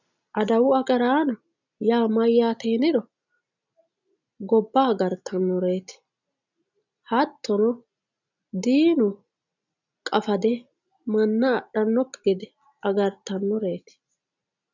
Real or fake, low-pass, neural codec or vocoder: real; 7.2 kHz; none